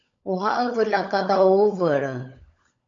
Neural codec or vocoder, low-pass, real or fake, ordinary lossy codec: codec, 16 kHz, 16 kbps, FunCodec, trained on LibriTTS, 50 frames a second; 7.2 kHz; fake; AAC, 48 kbps